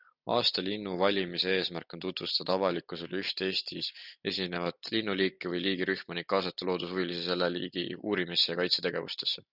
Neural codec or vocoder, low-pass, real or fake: none; 5.4 kHz; real